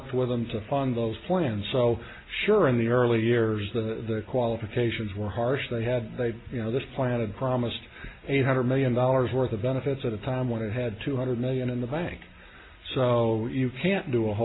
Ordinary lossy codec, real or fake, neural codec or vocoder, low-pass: AAC, 16 kbps; real; none; 7.2 kHz